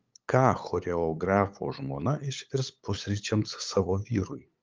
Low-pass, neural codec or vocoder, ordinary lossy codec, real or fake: 7.2 kHz; codec, 16 kHz, 8 kbps, FunCodec, trained on LibriTTS, 25 frames a second; Opus, 24 kbps; fake